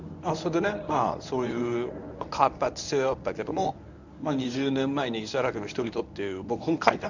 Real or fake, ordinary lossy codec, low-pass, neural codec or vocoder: fake; none; 7.2 kHz; codec, 24 kHz, 0.9 kbps, WavTokenizer, medium speech release version 1